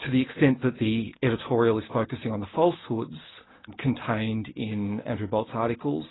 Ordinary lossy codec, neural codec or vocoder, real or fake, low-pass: AAC, 16 kbps; none; real; 7.2 kHz